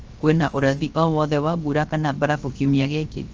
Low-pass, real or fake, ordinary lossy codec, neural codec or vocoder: 7.2 kHz; fake; Opus, 24 kbps; codec, 16 kHz, 0.7 kbps, FocalCodec